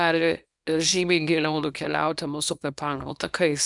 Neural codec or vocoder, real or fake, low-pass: codec, 24 kHz, 0.9 kbps, WavTokenizer, small release; fake; 10.8 kHz